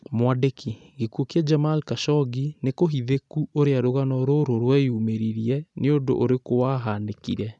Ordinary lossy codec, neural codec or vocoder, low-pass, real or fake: none; none; 10.8 kHz; real